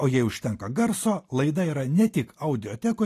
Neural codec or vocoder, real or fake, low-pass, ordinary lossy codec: none; real; 14.4 kHz; AAC, 48 kbps